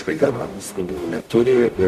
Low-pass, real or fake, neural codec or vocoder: 14.4 kHz; fake; codec, 44.1 kHz, 0.9 kbps, DAC